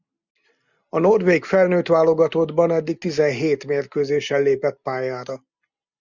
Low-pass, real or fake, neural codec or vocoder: 7.2 kHz; real; none